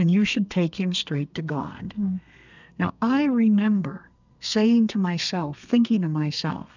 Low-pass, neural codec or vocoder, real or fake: 7.2 kHz; codec, 32 kHz, 1.9 kbps, SNAC; fake